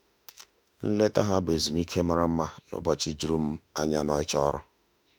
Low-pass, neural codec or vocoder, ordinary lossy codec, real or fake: none; autoencoder, 48 kHz, 32 numbers a frame, DAC-VAE, trained on Japanese speech; none; fake